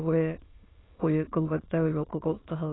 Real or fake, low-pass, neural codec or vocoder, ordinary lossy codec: fake; 7.2 kHz; autoencoder, 22.05 kHz, a latent of 192 numbers a frame, VITS, trained on many speakers; AAC, 16 kbps